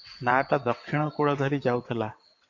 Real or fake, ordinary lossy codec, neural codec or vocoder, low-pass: fake; MP3, 48 kbps; vocoder, 22.05 kHz, 80 mel bands, WaveNeXt; 7.2 kHz